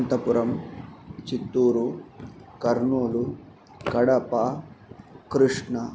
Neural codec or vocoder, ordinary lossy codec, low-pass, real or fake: none; none; none; real